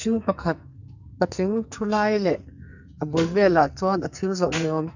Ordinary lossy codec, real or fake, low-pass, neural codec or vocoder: none; fake; 7.2 kHz; codec, 44.1 kHz, 2.6 kbps, SNAC